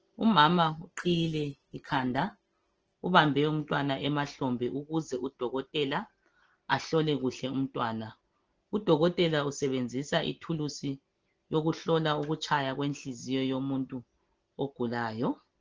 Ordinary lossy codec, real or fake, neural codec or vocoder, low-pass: Opus, 16 kbps; real; none; 7.2 kHz